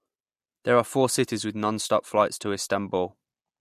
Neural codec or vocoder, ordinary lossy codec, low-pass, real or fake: none; MP3, 64 kbps; 14.4 kHz; real